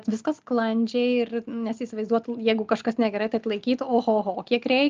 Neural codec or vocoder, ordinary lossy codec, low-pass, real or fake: none; Opus, 24 kbps; 7.2 kHz; real